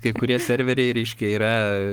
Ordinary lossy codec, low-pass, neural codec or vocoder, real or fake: Opus, 24 kbps; 19.8 kHz; codec, 44.1 kHz, 7.8 kbps, Pupu-Codec; fake